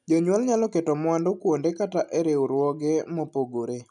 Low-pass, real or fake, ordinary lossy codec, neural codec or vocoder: 10.8 kHz; real; none; none